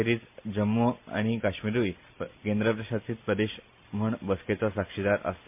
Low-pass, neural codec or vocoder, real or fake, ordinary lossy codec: 3.6 kHz; none; real; MP3, 32 kbps